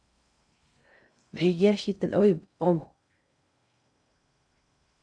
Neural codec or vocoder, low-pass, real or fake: codec, 16 kHz in and 24 kHz out, 0.6 kbps, FocalCodec, streaming, 2048 codes; 9.9 kHz; fake